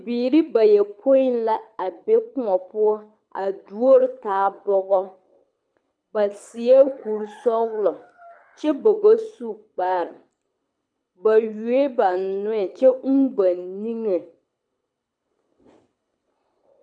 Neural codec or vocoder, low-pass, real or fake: codec, 24 kHz, 6 kbps, HILCodec; 9.9 kHz; fake